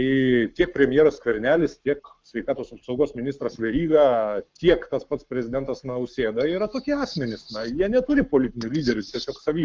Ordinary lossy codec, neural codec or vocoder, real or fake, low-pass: Opus, 32 kbps; codec, 44.1 kHz, 7.8 kbps, Pupu-Codec; fake; 7.2 kHz